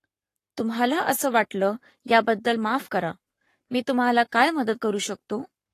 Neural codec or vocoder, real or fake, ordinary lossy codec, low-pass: codec, 44.1 kHz, 7.8 kbps, Pupu-Codec; fake; AAC, 48 kbps; 14.4 kHz